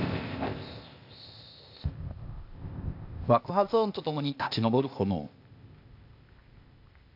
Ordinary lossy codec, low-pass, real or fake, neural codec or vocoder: AAC, 48 kbps; 5.4 kHz; fake; codec, 16 kHz, 0.8 kbps, ZipCodec